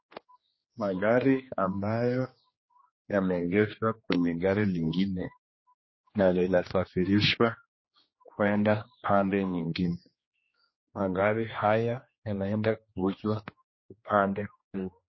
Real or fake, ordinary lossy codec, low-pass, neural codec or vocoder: fake; MP3, 24 kbps; 7.2 kHz; codec, 16 kHz, 2 kbps, X-Codec, HuBERT features, trained on general audio